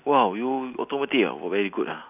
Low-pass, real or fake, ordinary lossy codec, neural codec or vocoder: 3.6 kHz; real; none; none